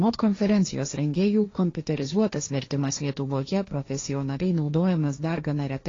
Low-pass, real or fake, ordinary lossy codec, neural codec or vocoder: 7.2 kHz; fake; AAC, 32 kbps; codec, 16 kHz, 1.1 kbps, Voila-Tokenizer